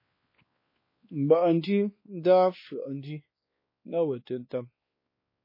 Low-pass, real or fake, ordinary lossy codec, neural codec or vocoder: 5.4 kHz; fake; MP3, 24 kbps; codec, 16 kHz, 2 kbps, X-Codec, WavLM features, trained on Multilingual LibriSpeech